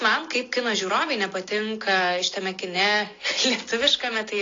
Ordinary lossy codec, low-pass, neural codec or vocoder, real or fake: AAC, 32 kbps; 7.2 kHz; none; real